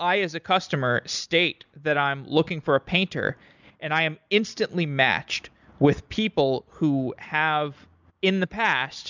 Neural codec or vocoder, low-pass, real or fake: none; 7.2 kHz; real